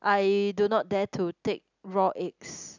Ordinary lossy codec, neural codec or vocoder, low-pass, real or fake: none; none; 7.2 kHz; real